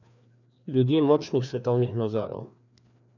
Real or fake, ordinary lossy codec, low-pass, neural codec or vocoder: fake; none; 7.2 kHz; codec, 16 kHz, 2 kbps, FreqCodec, larger model